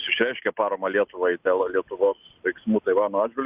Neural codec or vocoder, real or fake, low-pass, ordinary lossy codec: none; real; 3.6 kHz; Opus, 64 kbps